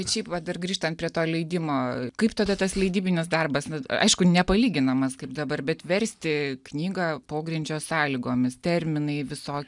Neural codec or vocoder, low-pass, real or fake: none; 10.8 kHz; real